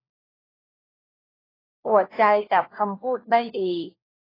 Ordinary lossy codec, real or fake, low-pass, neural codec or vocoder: AAC, 24 kbps; fake; 5.4 kHz; codec, 16 kHz, 1 kbps, FunCodec, trained on LibriTTS, 50 frames a second